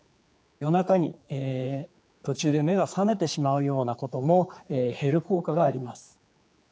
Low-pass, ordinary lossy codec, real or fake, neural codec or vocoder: none; none; fake; codec, 16 kHz, 4 kbps, X-Codec, HuBERT features, trained on general audio